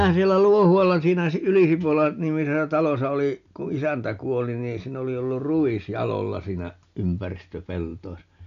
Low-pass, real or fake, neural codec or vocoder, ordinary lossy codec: 7.2 kHz; real; none; none